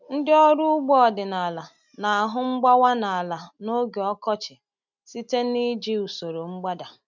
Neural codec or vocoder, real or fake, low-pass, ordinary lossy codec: none; real; 7.2 kHz; none